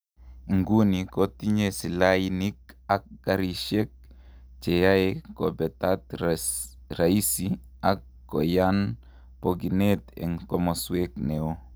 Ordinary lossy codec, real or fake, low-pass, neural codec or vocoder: none; real; none; none